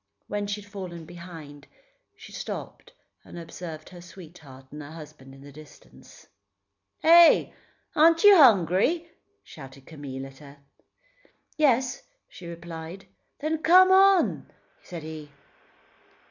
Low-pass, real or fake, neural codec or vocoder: 7.2 kHz; real; none